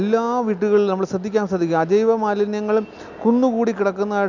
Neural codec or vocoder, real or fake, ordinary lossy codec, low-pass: none; real; none; 7.2 kHz